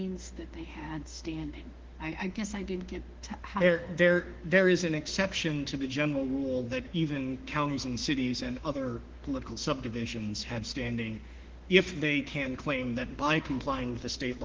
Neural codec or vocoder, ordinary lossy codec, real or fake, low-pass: autoencoder, 48 kHz, 32 numbers a frame, DAC-VAE, trained on Japanese speech; Opus, 16 kbps; fake; 7.2 kHz